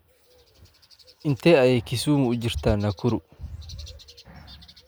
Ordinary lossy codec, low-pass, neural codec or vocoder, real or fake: none; none; none; real